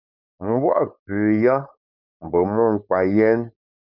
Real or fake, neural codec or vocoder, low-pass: fake; codec, 16 kHz, 4.8 kbps, FACodec; 5.4 kHz